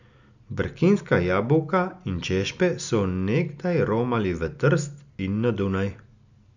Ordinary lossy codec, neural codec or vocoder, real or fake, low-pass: none; none; real; 7.2 kHz